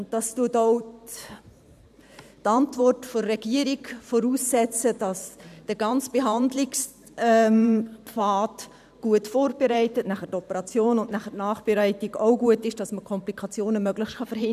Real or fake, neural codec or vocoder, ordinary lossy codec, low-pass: fake; vocoder, 44.1 kHz, 128 mel bands every 512 samples, BigVGAN v2; none; 14.4 kHz